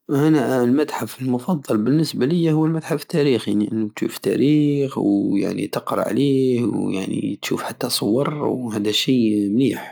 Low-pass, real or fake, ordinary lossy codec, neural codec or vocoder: none; real; none; none